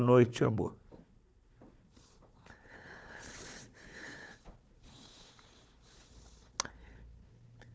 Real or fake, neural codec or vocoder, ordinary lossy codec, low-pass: fake; codec, 16 kHz, 4 kbps, FunCodec, trained on Chinese and English, 50 frames a second; none; none